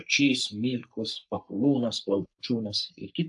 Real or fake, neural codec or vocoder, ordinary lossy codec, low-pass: fake; codec, 44.1 kHz, 3.4 kbps, Pupu-Codec; AAC, 64 kbps; 10.8 kHz